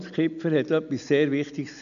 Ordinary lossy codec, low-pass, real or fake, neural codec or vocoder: none; 7.2 kHz; real; none